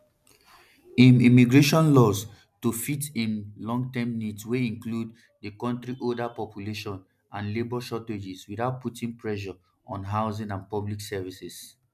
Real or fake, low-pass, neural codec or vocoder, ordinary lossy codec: real; 14.4 kHz; none; none